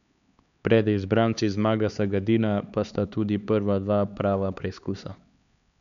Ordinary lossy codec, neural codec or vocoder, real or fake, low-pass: MP3, 96 kbps; codec, 16 kHz, 4 kbps, X-Codec, HuBERT features, trained on LibriSpeech; fake; 7.2 kHz